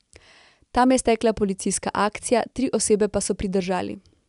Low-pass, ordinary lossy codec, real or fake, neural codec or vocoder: 10.8 kHz; none; real; none